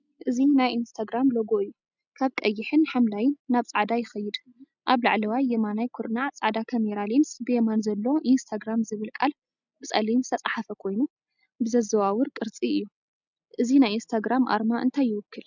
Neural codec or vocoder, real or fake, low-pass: none; real; 7.2 kHz